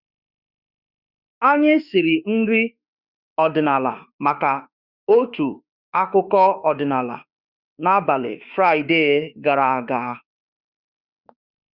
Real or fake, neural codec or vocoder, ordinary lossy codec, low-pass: fake; autoencoder, 48 kHz, 32 numbers a frame, DAC-VAE, trained on Japanese speech; Opus, 64 kbps; 5.4 kHz